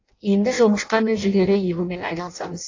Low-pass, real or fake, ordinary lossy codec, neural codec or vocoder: 7.2 kHz; fake; AAC, 48 kbps; codec, 16 kHz in and 24 kHz out, 0.6 kbps, FireRedTTS-2 codec